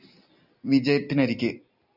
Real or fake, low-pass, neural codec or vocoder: real; 5.4 kHz; none